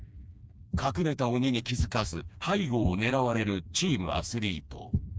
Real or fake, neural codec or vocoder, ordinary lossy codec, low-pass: fake; codec, 16 kHz, 2 kbps, FreqCodec, smaller model; none; none